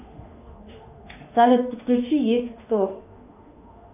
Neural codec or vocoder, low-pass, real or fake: autoencoder, 48 kHz, 32 numbers a frame, DAC-VAE, trained on Japanese speech; 3.6 kHz; fake